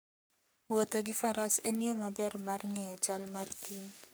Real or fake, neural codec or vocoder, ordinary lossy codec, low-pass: fake; codec, 44.1 kHz, 3.4 kbps, Pupu-Codec; none; none